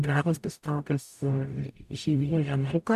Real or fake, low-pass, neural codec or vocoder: fake; 14.4 kHz; codec, 44.1 kHz, 0.9 kbps, DAC